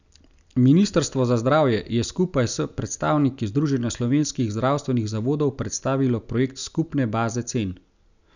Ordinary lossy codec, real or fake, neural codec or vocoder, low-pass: none; real; none; 7.2 kHz